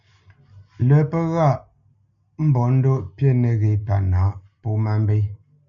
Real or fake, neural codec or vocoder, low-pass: real; none; 7.2 kHz